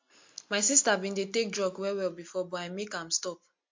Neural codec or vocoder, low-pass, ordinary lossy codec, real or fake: none; 7.2 kHz; MP3, 48 kbps; real